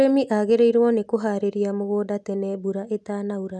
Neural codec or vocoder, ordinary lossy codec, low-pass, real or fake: none; none; none; real